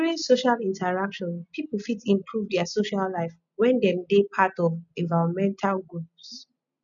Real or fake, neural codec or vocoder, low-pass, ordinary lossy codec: real; none; 7.2 kHz; none